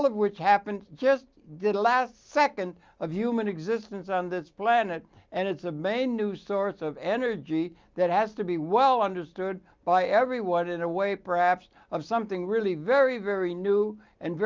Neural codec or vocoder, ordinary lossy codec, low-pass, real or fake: none; Opus, 32 kbps; 7.2 kHz; real